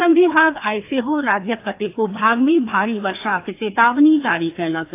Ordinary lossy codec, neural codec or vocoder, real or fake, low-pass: AAC, 24 kbps; codec, 16 kHz, 2 kbps, FreqCodec, larger model; fake; 3.6 kHz